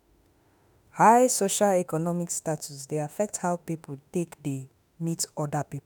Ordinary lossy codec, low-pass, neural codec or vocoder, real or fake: none; none; autoencoder, 48 kHz, 32 numbers a frame, DAC-VAE, trained on Japanese speech; fake